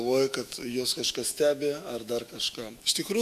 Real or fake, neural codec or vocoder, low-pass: fake; autoencoder, 48 kHz, 128 numbers a frame, DAC-VAE, trained on Japanese speech; 14.4 kHz